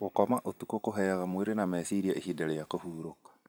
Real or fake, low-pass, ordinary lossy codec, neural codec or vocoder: fake; none; none; vocoder, 44.1 kHz, 128 mel bands every 512 samples, BigVGAN v2